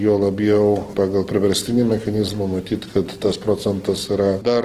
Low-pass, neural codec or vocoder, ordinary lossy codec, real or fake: 14.4 kHz; none; Opus, 16 kbps; real